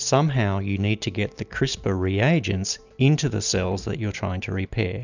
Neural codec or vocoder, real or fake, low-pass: none; real; 7.2 kHz